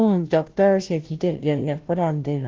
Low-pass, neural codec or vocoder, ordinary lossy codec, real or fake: 7.2 kHz; codec, 16 kHz, 0.5 kbps, FunCodec, trained on Chinese and English, 25 frames a second; Opus, 16 kbps; fake